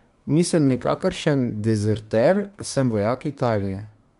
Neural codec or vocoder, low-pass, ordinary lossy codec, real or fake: codec, 24 kHz, 1 kbps, SNAC; 10.8 kHz; MP3, 96 kbps; fake